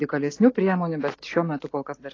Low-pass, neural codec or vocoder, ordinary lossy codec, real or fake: 7.2 kHz; none; AAC, 32 kbps; real